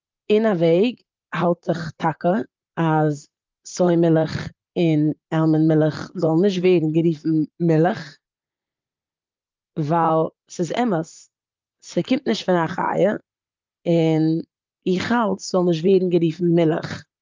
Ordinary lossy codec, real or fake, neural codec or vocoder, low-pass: Opus, 24 kbps; fake; vocoder, 44.1 kHz, 128 mel bands, Pupu-Vocoder; 7.2 kHz